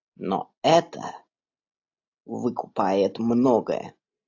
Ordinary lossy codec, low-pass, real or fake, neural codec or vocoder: AAC, 48 kbps; 7.2 kHz; real; none